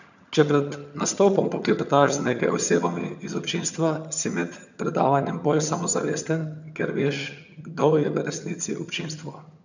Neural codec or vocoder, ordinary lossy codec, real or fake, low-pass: vocoder, 22.05 kHz, 80 mel bands, HiFi-GAN; none; fake; 7.2 kHz